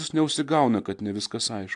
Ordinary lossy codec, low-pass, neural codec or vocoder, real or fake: AAC, 64 kbps; 10.8 kHz; none; real